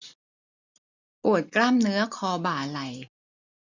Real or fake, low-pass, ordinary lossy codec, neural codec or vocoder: real; 7.2 kHz; none; none